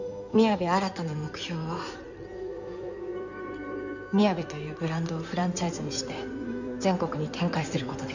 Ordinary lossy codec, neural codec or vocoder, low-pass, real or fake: none; codec, 16 kHz in and 24 kHz out, 2.2 kbps, FireRedTTS-2 codec; 7.2 kHz; fake